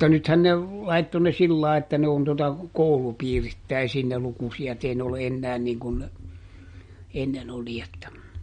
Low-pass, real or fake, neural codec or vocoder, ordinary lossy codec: 9.9 kHz; real; none; MP3, 48 kbps